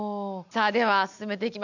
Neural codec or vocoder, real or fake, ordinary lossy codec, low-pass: none; real; none; 7.2 kHz